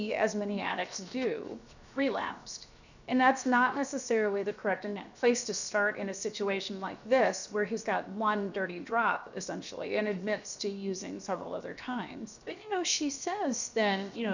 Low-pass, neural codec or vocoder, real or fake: 7.2 kHz; codec, 16 kHz, 0.7 kbps, FocalCodec; fake